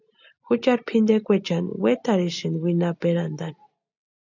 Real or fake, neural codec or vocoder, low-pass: real; none; 7.2 kHz